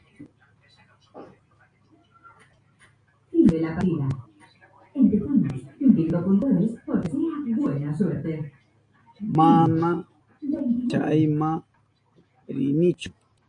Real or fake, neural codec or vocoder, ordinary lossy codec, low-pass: real; none; AAC, 48 kbps; 9.9 kHz